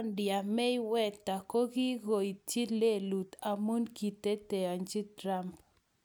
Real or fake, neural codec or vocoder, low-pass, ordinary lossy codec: real; none; none; none